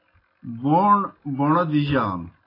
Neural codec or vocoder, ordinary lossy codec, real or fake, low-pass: none; AAC, 24 kbps; real; 5.4 kHz